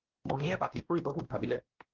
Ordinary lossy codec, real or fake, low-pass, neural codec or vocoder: Opus, 16 kbps; fake; 7.2 kHz; codec, 16 kHz, 1 kbps, X-Codec, WavLM features, trained on Multilingual LibriSpeech